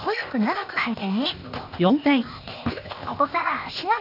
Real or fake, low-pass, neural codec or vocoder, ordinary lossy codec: fake; 5.4 kHz; codec, 16 kHz, 0.8 kbps, ZipCodec; none